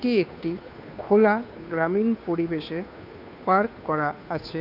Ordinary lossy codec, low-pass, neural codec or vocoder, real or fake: none; 5.4 kHz; codec, 16 kHz, 2 kbps, FunCodec, trained on Chinese and English, 25 frames a second; fake